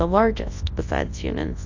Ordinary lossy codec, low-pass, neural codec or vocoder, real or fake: AAC, 48 kbps; 7.2 kHz; codec, 24 kHz, 0.9 kbps, WavTokenizer, large speech release; fake